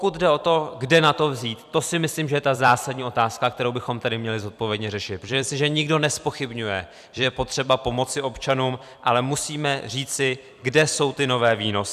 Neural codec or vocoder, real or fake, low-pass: vocoder, 44.1 kHz, 128 mel bands every 256 samples, BigVGAN v2; fake; 14.4 kHz